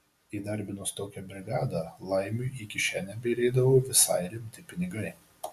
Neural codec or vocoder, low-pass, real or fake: none; 14.4 kHz; real